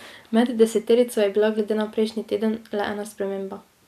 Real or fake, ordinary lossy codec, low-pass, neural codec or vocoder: real; none; 14.4 kHz; none